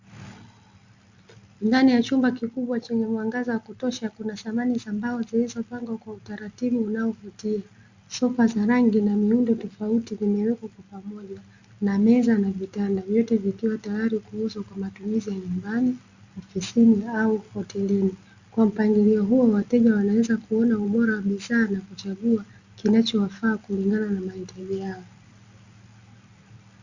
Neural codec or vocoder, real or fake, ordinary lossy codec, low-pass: none; real; Opus, 64 kbps; 7.2 kHz